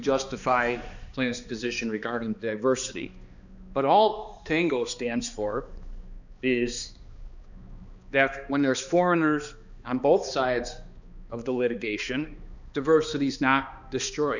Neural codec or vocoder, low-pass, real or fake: codec, 16 kHz, 2 kbps, X-Codec, HuBERT features, trained on balanced general audio; 7.2 kHz; fake